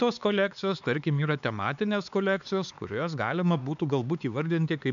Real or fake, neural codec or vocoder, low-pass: fake; codec, 16 kHz, 4 kbps, X-Codec, HuBERT features, trained on LibriSpeech; 7.2 kHz